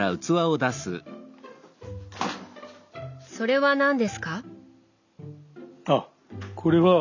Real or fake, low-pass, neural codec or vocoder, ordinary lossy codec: real; 7.2 kHz; none; none